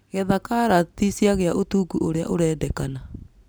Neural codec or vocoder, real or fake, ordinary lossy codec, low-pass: none; real; none; none